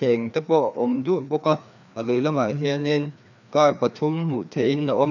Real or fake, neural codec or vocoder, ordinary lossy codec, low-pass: fake; codec, 16 kHz, 2 kbps, FreqCodec, larger model; none; 7.2 kHz